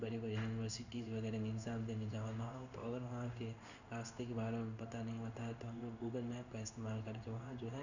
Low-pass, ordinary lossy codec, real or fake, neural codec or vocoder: 7.2 kHz; none; fake; codec, 16 kHz in and 24 kHz out, 1 kbps, XY-Tokenizer